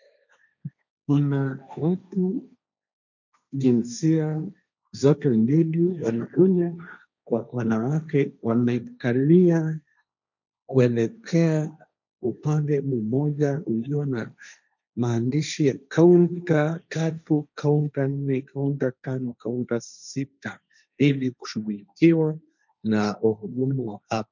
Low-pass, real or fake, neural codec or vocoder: 7.2 kHz; fake; codec, 16 kHz, 1.1 kbps, Voila-Tokenizer